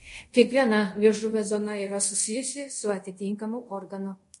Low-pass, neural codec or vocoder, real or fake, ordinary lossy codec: 10.8 kHz; codec, 24 kHz, 0.5 kbps, DualCodec; fake; MP3, 48 kbps